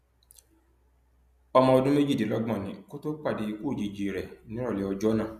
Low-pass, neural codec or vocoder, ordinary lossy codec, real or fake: 14.4 kHz; none; none; real